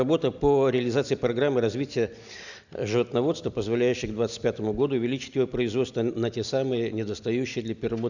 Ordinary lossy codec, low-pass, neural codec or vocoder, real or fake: none; 7.2 kHz; none; real